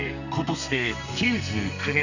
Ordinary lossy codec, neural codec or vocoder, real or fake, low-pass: none; codec, 32 kHz, 1.9 kbps, SNAC; fake; 7.2 kHz